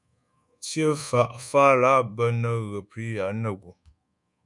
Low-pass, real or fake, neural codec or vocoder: 10.8 kHz; fake; codec, 24 kHz, 1.2 kbps, DualCodec